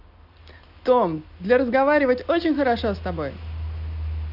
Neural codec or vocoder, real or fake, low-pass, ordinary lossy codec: none; real; 5.4 kHz; none